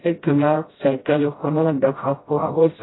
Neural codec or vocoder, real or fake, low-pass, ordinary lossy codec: codec, 16 kHz, 0.5 kbps, FreqCodec, smaller model; fake; 7.2 kHz; AAC, 16 kbps